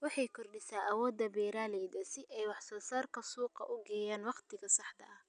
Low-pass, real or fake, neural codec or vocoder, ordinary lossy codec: 9.9 kHz; real; none; none